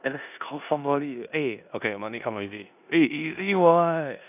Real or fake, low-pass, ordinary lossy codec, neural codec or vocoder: fake; 3.6 kHz; none; codec, 16 kHz in and 24 kHz out, 0.9 kbps, LongCat-Audio-Codec, four codebook decoder